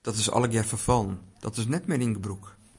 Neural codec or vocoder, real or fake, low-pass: none; real; 10.8 kHz